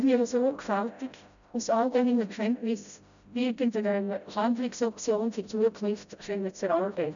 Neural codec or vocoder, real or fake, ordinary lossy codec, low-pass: codec, 16 kHz, 0.5 kbps, FreqCodec, smaller model; fake; none; 7.2 kHz